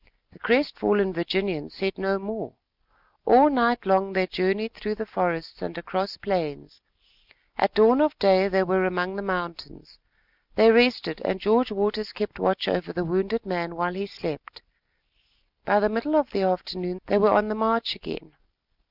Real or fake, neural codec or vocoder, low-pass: real; none; 5.4 kHz